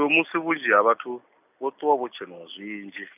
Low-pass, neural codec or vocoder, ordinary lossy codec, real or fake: 3.6 kHz; none; none; real